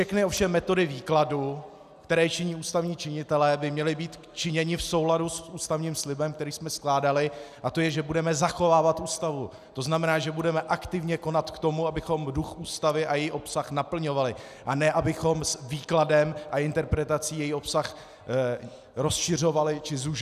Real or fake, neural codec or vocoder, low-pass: real; none; 14.4 kHz